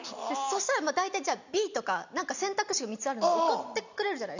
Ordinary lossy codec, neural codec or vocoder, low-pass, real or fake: none; none; 7.2 kHz; real